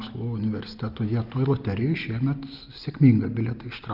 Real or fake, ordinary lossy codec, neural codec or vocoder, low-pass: real; Opus, 24 kbps; none; 5.4 kHz